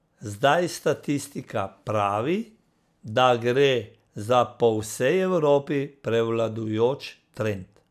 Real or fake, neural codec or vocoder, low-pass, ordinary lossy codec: real; none; 14.4 kHz; none